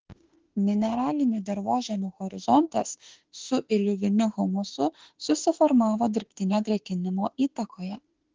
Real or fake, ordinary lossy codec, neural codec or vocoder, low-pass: fake; Opus, 16 kbps; autoencoder, 48 kHz, 32 numbers a frame, DAC-VAE, trained on Japanese speech; 7.2 kHz